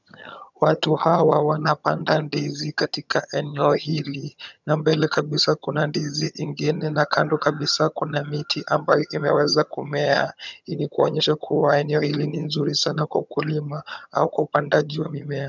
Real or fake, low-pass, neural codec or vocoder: fake; 7.2 kHz; vocoder, 22.05 kHz, 80 mel bands, HiFi-GAN